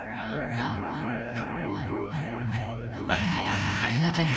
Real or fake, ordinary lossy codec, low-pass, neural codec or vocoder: fake; none; none; codec, 16 kHz, 0.5 kbps, FreqCodec, larger model